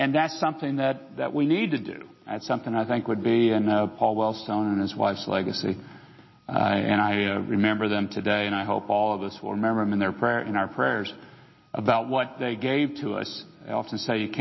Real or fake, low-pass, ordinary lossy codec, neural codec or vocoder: real; 7.2 kHz; MP3, 24 kbps; none